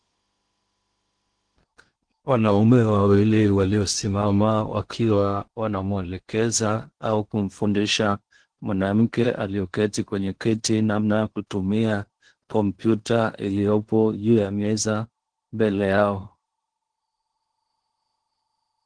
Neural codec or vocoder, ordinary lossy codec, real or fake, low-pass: codec, 16 kHz in and 24 kHz out, 0.8 kbps, FocalCodec, streaming, 65536 codes; Opus, 16 kbps; fake; 9.9 kHz